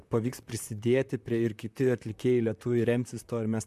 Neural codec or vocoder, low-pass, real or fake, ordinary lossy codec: vocoder, 44.1 kHz, 128 mel bands, Pupu-Vocoder; 14.4 kHz; fake; MP3, 96 kbps